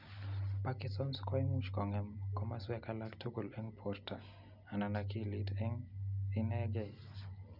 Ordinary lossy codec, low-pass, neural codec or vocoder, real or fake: none; 5.4 kHz; none; real